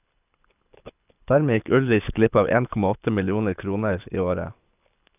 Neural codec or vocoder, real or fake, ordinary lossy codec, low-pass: codec, 24 kHz, 6 kbps, HILCodec; fake; none; 3.6 kHz